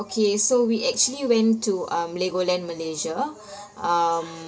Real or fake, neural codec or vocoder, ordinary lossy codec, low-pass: real; none; none; none